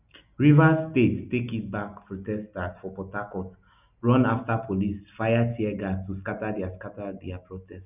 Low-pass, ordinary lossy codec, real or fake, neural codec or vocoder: 3.6 kHz; none; real; none